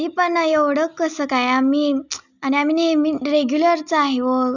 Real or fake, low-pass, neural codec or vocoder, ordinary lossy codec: real; 7.2 kHz; none; none